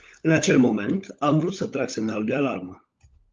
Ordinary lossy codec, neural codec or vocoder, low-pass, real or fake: Opus, 32 kbps; codec, 16 kHz, 16 kbps, FunCodec, trained on LibriTTS, 50 frames a second; 7.2 kHz; fake